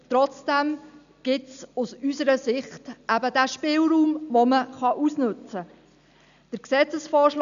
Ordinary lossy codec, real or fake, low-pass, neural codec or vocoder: none; real; 7.2 kHz; none